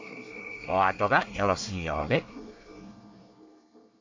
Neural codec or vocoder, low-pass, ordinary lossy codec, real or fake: codec, 24 kHz, 1 kbps, SNAC; 7.2 kHz; MP3, 64 kbps; fake